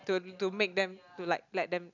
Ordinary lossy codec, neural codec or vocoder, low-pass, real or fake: none; none; 7.2 kHz; real